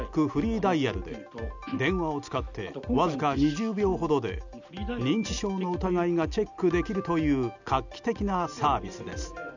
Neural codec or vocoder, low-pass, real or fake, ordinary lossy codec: none; 7.2 kHz; real; none